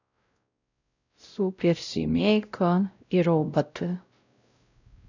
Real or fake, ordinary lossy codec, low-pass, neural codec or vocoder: fake; none; 7.2 kHz; codec, 16 kHz, 0.5 kbps, X-Codec, WavLM features, trained on Multilingual LibriSpeech